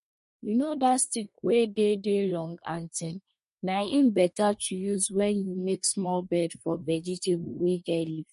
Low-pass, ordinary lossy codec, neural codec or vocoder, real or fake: 10.8 kHz; MP3, 48 kbps; codec, 24 kHz, 1 kbps, SNAC; fake